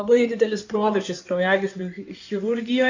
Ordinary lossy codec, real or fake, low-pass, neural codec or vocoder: AAC, 48 kbps; fake; 7.2 kHz; codec, 16 kHz in and 24 kHz out, 2.2 kbps, FireRedTTS-2 codec